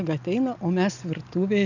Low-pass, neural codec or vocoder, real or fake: 7.2 kHz; none; real